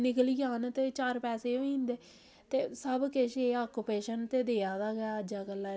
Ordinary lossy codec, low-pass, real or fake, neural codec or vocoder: none; none; real; none